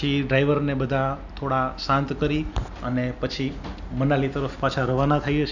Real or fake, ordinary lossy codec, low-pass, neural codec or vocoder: real; none; 7.2 kHz; none